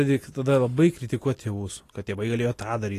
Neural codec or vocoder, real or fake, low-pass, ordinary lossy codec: none; real; 14.4 kHz; AAC, 48 kbps